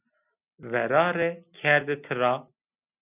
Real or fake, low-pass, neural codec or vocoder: real; 3.6 kHz; none